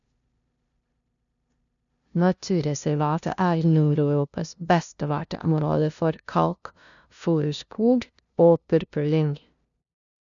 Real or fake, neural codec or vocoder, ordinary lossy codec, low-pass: fake; codec, 16 kHz, 0.5 kbps, FunCodec, trained on LibriTTS, 25 frames a second; none; 7.2 kHz